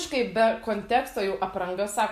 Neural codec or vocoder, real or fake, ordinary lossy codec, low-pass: none; real; MP3, 64 kbps; 14.4 kHz